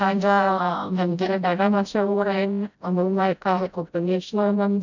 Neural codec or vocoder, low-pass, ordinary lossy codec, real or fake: codec, 16 kHz, 0.5 kbps, FreqCodec, smaller model; 7.2 kHz; none; fake